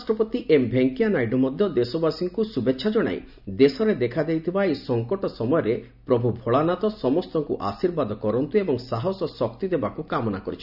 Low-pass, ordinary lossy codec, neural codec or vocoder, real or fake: 5.4 kHz; none; none; real